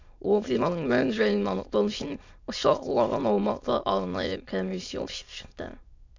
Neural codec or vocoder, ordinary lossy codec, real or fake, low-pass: autoencoder, 22.05 kHz, a latent of 192 numbers a frame, VITS, trained on many speakers; MP3, 64 kbps; fake; 7.2 kHz